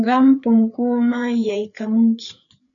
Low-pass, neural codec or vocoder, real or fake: 7.2 kHz; codec, 16 kHz, 4 kbps, FreqCodec, larger model; fake